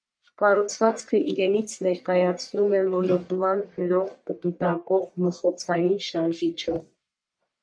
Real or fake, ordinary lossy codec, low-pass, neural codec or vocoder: fake; MP3, 64 kbps; 9.9 kHz; codec, 44.1 kHz, 1.7 kbps, Pupu-Codec